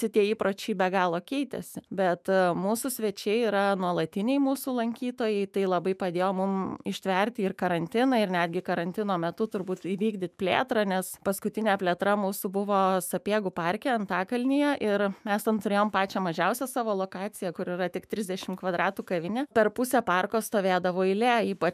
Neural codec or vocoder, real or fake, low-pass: autoencoder, 48 kHz, 128 numbers a frame, DAC-VAE, trained on Japanese speech; fake; 14.4 kHz